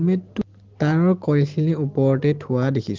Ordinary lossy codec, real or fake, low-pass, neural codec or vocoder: Opus, 16 kbps; real; 7.2 kHz; none